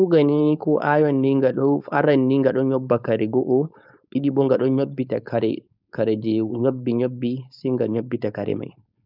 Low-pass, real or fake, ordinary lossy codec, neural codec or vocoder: 5.4 kHz; fake; none; codec, 16 kHz, 4.8 kbps, FACodec